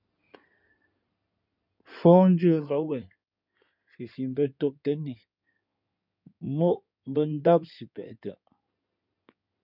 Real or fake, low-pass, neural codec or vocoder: fake; 5.4 kHz; codec, 16 kHz in and 24 kHz out, 2.2 kbps, FireRedTTS-2 codec